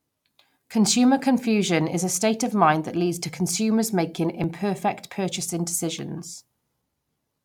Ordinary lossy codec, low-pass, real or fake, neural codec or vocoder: none; 19.8 kHz; real; none